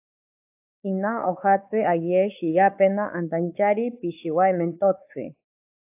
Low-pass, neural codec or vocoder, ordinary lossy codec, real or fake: 3.6 kHz; codec, 16 kHz, 4 kbps, X-Codec, WavLM features, trained on Multilingual LibriSpeech; AAC, 32 kbps; fake